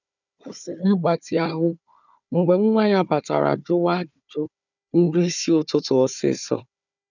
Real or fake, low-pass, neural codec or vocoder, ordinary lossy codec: fake; 7.2 kHz; codec, 16 kHz, 4 kbps, FunCodec, trained on Chinese and English, 50 frames a second; none